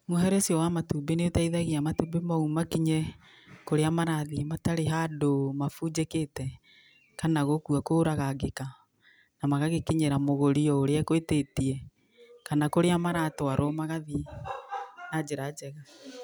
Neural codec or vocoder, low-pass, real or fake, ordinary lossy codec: none; none; real; none